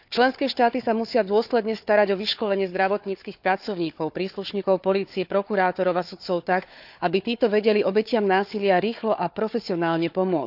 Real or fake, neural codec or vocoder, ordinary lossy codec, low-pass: fake; codec, 16 kHz, 4 kbps, FunCodec, trained on Chinese and English, 50 frames a second; none; 5.4 kHz